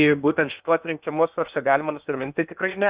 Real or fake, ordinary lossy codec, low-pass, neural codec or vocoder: fake; Opus, 64 kbps; 3.6 kHz; codec, 16 kHz in and 24 kHz out, 0.8 kbps, FocalCodec, streaming, 65536 codes